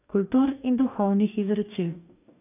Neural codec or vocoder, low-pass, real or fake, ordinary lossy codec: codec, 44.1 kHz, 2.6 kbps, DAC; 3.6 kHz; fake; none